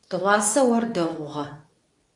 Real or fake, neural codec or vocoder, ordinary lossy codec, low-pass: fake; codec, 24 kHz, 0.9 kbps, WavTokenizer, medium speech release version 2; AAC, 64 kbps; 10.8 kHz